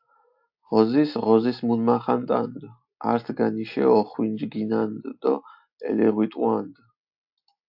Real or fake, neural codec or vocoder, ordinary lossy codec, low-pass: real; none; AAC, 48 kbps; 5.4 kHz